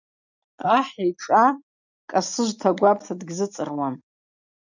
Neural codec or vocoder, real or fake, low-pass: none; real; 7.2 kHz